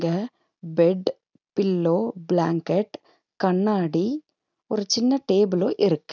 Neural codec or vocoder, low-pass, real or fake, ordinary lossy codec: none; 7.2 kHz; real; none